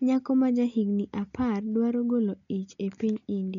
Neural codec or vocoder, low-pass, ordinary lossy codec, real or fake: none; 7.2 kHz; none; real